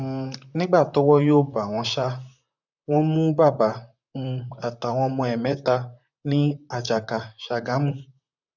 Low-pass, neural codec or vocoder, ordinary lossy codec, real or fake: 7.2 kHz; vocoder, 44.1 kHz, 128 mel bands, Pupu-Vocoder; none; fake